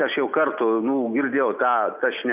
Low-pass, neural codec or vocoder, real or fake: 3.6 kHz; none; real